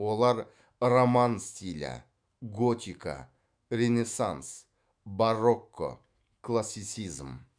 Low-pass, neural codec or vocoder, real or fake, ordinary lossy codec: 9.9 kHz; autoencoder, 48 kHz, 128 numbers a frame, DAC-VAE, trained on Japanese speech; fake; Opus, 64 kbps